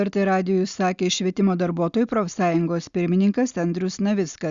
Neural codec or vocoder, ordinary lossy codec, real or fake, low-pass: none; Opus, 64 kbps; real; 7.2 kHz